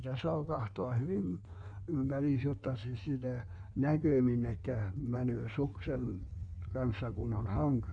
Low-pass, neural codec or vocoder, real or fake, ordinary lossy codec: 9.9 kHz; codec, 16 kHz in and 24 kHz out, 1.1 kbps, FireRedTTS-2 codec; fake; none